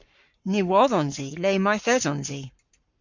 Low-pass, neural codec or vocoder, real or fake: 7.2 kHz; codec, 44.1 kHz, 7.8 kbps, Pupu-Codec; fake